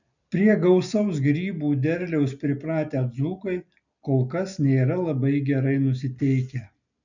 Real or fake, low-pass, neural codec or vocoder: real; 7.2 kHz; none